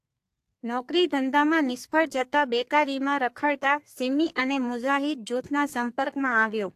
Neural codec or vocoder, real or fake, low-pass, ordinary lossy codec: codec, 32 kHz, 1.9 kbps, SNAC; fake; 14.4 kHz; AAC, 64 kbps